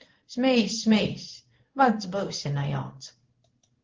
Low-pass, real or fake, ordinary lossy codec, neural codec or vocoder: 7.2 kHz; fake; Opus, 16 kbps; codec, 16 kHz in and 24 kHz out, 1 kbps, XY-Tokenizer